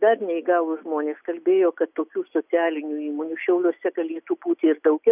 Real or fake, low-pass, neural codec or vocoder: real; 3.6 kHz; none